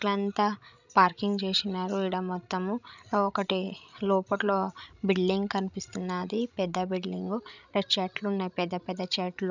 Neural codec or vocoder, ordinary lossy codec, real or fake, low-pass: codec, 16 kHz, 16 kbps, FreqCodec, larger model; none; fake; 7.2 kHz